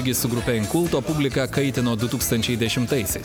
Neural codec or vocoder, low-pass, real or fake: none; 19.8 kHz; real